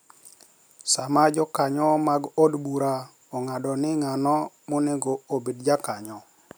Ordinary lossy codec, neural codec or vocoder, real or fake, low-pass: none; none; real; none